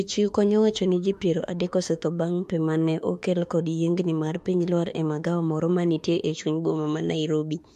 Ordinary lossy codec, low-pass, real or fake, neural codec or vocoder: MP3, 64 kbps; 14.4 kHz; fake; autoencoder, 48 kHz, 32 numbers a frame, DAC-VAE, trained on Japanese speech